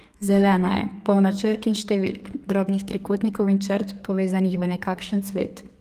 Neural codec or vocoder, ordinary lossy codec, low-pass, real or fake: codec, 32 kHz, 1.9 kbps, SNAC; Opus, 32 kbps; 14.4 kHz; fake